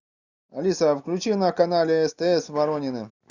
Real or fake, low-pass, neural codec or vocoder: real; 7.2 kHz; none